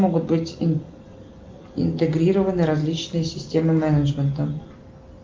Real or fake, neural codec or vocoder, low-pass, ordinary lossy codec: real; none; 7.2 kHz; Opus, 32 kbps